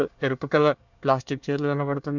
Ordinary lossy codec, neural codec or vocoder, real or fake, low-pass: none; codec, 24 kHz, 1 kbps, SNAC; fake; 7.2 kHz